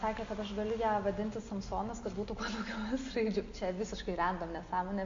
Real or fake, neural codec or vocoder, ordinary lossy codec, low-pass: real; none; MP3, 48 kbps; 7.2 kHz